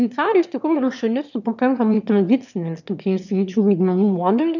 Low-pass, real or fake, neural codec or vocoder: 7.2 kHz; fake; autoencoder, 22.05 kHz, a latent of 192 numbers a frame, VITS, trained on one speaker